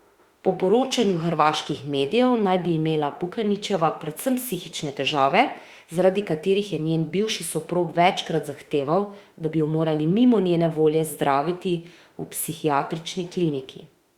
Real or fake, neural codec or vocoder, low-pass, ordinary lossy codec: fake; autoencoder, 48 kHz, 32 numbers a frame, DAC-VAE, trained on Japanese speech; 19.8 kHz; Opus, 64 kbps